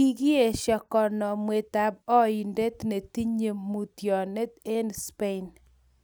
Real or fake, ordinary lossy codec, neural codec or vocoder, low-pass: real; none; none; none